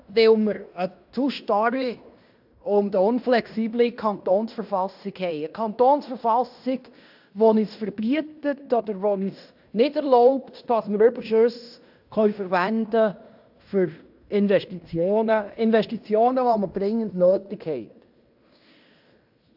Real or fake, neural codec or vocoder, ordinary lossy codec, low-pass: fake; codec, 16 kHz in and 24 kHz out, 0.9 kbps, LongCat-Audio-Codec, fine tuned four codebook decoder; AAC, 48 kbps; 5.4 kHz